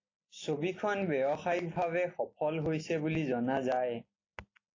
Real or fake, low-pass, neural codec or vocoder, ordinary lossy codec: real; 7.2 kHz; none; AAC, 32 kbps